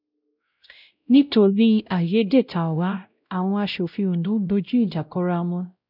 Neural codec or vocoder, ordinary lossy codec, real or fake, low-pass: codec, 16 kHz, 0.5 kbps, X-Codec, WavLM features, trained on Multilingual LibriSpeech; none; fake; 5.4 kHz